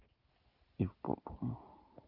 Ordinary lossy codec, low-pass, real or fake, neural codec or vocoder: Opus, 32 kbps; 5.4 kHz; real; none